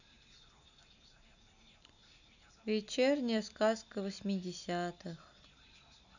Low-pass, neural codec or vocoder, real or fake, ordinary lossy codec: 7.2 kHz; none; real; none